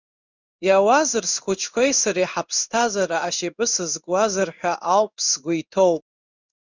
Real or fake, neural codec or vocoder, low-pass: fake; codec, 16 kHz in and 24 kHz out, 1 kbps, XY-Tokenizer; 7.2 kHz